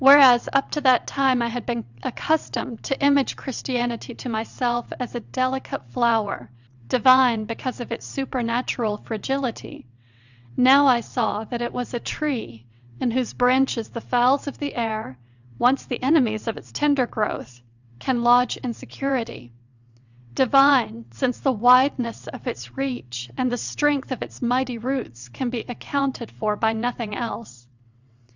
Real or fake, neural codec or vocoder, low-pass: fake; vocoder, 22.05 kHz, 80 mel bands, WaveNeXt; 7.2 kHz